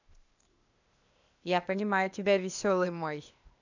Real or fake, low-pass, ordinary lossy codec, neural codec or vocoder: fake; 7.2 kHz; none; codec, 16 kHz, 0.8 kbps, ZipCodec